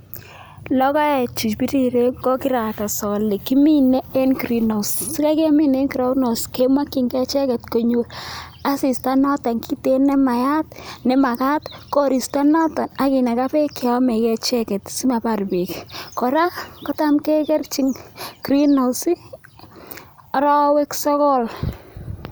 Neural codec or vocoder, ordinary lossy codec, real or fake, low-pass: none; none; real; none